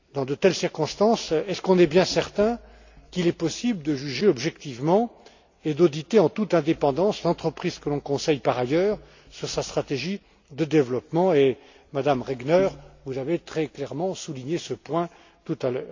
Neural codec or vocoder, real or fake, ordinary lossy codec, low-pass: none; real; AAC, 48 kbps; 7.2 kHz